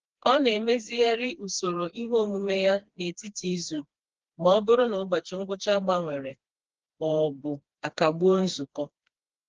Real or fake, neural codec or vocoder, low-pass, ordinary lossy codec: fake; codec, 16 kHz, 2 kbps, FreqCodec, smaller model; 7.2 kHz; Opus, 16 kbps